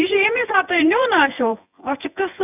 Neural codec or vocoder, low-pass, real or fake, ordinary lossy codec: vocoder, 24 kHz, 100 mel bands, Vocos; 3.6 kHz; fake; none